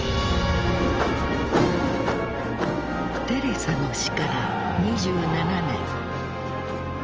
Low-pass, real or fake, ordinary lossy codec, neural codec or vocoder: 7.2 kHz; real; Opus, 24 kbps; none